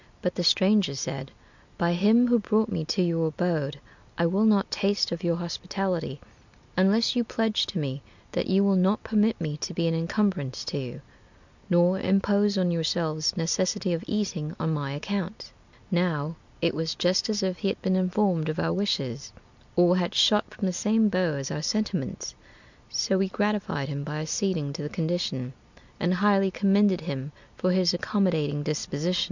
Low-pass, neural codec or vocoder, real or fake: 7.2 kHz; none; real